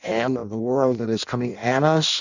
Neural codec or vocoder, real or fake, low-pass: codec, 16 kHz in and 24 kHz out, 0.6 kbps, FireRedTTS-2 codec; fake; 7.2 kHz